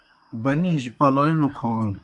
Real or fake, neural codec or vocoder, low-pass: fake; codec, 24 kHz, 1 kbps, SNAC; 10.8 kHz